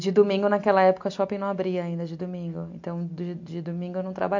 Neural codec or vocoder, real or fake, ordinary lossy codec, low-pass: none; real; MP3, 48 kbps; 7.2 kHz